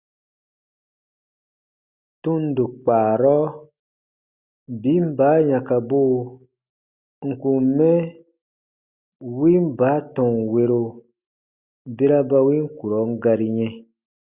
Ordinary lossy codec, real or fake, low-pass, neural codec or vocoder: Opus, 64 kbps; real; 3.6 kHz; none